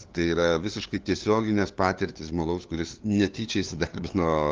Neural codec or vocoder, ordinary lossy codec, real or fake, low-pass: codec, 16 kHz, 2 kbps, FunCodec, trained on LibriTTS, 25 frames a second; Opus, 32 kbps; fake; 7.2 kHz